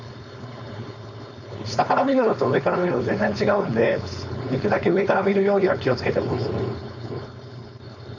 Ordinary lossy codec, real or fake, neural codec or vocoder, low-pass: none; fake; codec, 16 kHz, 4.8 kbps, FACodec; 7.2 kHz